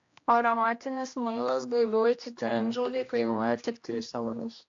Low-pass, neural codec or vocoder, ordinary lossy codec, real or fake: 7.2 kHz; codec, 16 kHz, 1 kbps, X-Codec, HuBERT features, trained on general audio; AAC, 48 kbps; fake